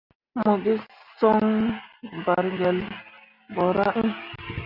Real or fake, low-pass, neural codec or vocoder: real; 5.4 kHz; none